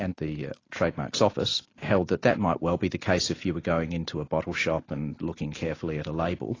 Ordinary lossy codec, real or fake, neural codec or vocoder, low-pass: AAC, 32 kbps; real; none; 7.2 kHz